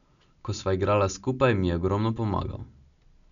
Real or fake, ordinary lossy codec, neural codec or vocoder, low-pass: real; none; none; 7.2 kHz